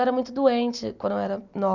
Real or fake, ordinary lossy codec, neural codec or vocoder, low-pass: real; none; none; 7.2 kHz